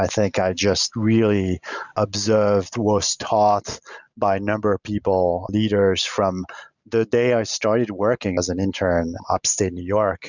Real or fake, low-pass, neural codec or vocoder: real; 7.2 kHz; none